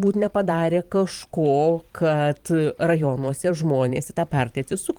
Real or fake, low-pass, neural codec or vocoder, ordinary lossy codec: fake; 19.8 kHz; vocoder, 44.1 kHz, 128 mel bands every 512 samples, BigVGAN v2; Opus, 24 kbps